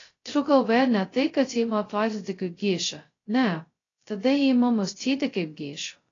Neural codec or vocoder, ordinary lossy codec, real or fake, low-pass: codec, 16 kHz, 0.2 kbps, FocalCodec; AAC, 32 kbps; fake; 7.2 kHz